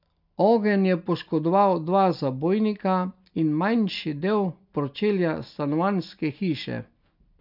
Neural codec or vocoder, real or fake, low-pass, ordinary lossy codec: none; real; 5.4 kHz; none